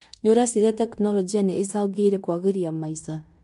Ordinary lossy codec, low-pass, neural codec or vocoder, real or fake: MP3, 64 kbps; 10.8 kHz; codec, 16 kHz in and 24 kHz out, 0.9 kbps, LongCat-Audio-Codec, fine tuned four codebook decoder; fake